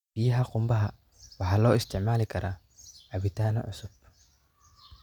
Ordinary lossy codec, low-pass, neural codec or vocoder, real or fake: none; 19.8 kHz; none; real